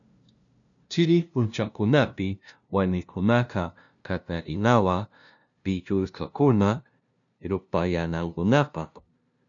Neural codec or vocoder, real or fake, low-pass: codec, 16 kHz, 0.5 kbps, FunCodec, trained on LibriTTS, 25 frames a second; fake; 7.2 kHz